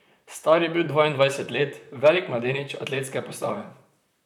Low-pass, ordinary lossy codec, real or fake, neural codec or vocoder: 19.8 kHz; none; fake; vocoder, 44.1 kHz, 128 mel bands, Pupu-Vocoder